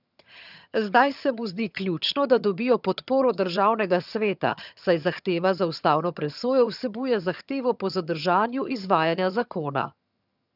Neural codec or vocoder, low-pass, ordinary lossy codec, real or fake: vocoder, 22.05 kHz, 80 mel bands, HiFi-GAN; 5.4 kHz; none; fake